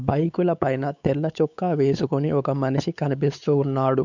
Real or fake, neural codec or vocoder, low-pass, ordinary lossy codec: fake; codec, 16 kHz, 4 kbps, X-Codec, WavLM features, trained on Multilingual LibriSpeech; 7.2 kHz; none